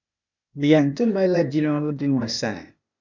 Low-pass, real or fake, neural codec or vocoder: 7.2 kHz; fake; codec, 16 kHz, 0.8 kbps, ZipCodec